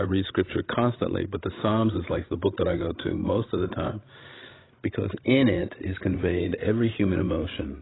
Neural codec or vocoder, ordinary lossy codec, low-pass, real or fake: codec, 16 kHz, 16 kbps, FreqCodec, larger model; AAC, 16 kbps; 7.2 kHz; fake